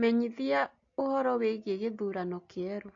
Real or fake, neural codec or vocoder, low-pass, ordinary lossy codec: real; none; 7.2 kHz; AAC, 48 kbps